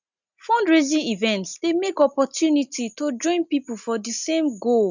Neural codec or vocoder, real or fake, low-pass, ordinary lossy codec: none; real; 7.2 kHz; none